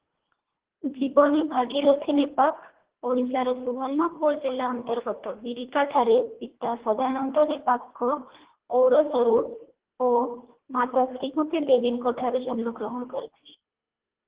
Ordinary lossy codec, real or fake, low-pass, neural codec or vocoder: Opus, 16 kbps; fake; 3.6 kHz; codec, 24 kHz, 1.5 kbps, HILCodec